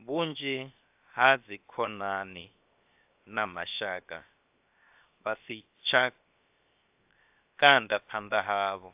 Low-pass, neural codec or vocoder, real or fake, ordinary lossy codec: 3.6 kHz; codec, 16 kHz, 0.7 kbps, FocalCodec; fake; AAC, 32 kbps